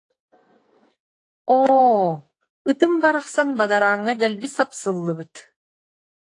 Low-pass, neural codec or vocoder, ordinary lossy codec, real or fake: 10.8 kHz; codec, 44.1 kHz, 2.6 kbps, SNAC; AAC, 48 kbps; fake